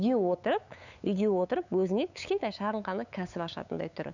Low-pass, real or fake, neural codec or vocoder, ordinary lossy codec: 7.2 kHz; fake; codec, 16 kHz, 8 kbps, FunCodec, trained on LibriTTS, 25 frames a second; none